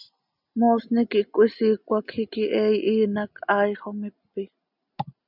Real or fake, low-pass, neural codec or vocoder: real; 5.4 kHz; none